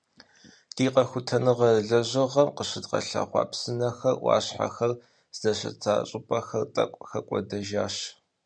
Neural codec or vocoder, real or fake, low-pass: none; real; 9.9 kHz